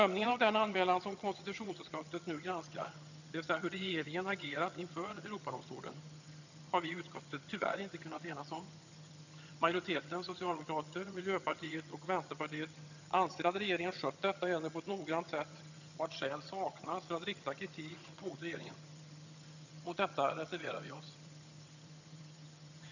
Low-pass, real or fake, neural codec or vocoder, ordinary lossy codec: 7.2 kHz; fake; vocoder, 22.05 kHz, 80 mel bands, HiFi-GAN; none